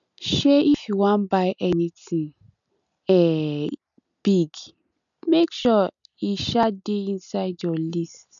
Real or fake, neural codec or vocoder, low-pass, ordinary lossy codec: real; none; 7.2 kHz; none